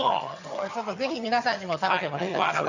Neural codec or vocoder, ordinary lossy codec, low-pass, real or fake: vocoder, 22.05 kHz, 80 mel bands, HiFi-GAN; none; 7.2 kHz; fake